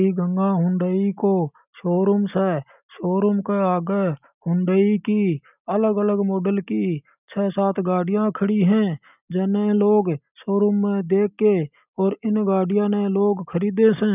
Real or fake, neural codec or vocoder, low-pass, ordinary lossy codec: real; none; 3.6 kHz; none